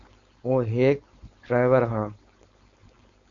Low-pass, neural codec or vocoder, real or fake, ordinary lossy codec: 7.2 kHz; codec, 16 kHz, 4.8 kbps, FACodec; fake; Opus, 64 kbps